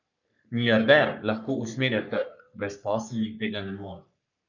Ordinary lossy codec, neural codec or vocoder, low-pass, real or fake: none; codec, 44.1 kHz, 3.4 kbps, Pupu-Codec; 7.2 kHz; fake